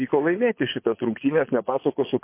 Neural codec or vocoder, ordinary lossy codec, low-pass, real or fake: vocoder, 22.05 kHz, 80 mel bands, Vocos; MP3, 24 kbps; 3.6 kHz; fake